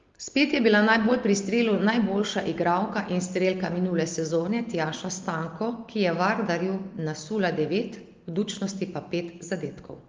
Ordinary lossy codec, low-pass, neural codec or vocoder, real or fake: Opus, 32 kbps; 7.2 kHz; none; real